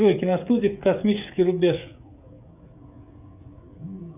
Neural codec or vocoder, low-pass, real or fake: codec, 16 kHz, 16 kbps, FreqCodec, smaller model; 3.6 kHz; fake